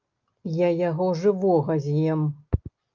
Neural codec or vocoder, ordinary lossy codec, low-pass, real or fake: none; Opus, 32 kbps; 7.2 kHz; real